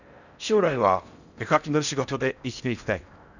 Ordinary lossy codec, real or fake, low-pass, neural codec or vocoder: none; fake; 7.2 kHz; codec, 16 kHz in and 24 kHz out, 0.6 kbps, FocalCodec, streaming, 4096 codes